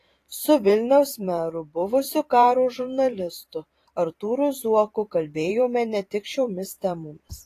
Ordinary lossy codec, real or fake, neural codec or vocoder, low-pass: AAC, 48 kbps; fake; vocoder, 44.1 kHz, 128 mel bands every 512 samples, BigVGAN v2; 14.4 kHz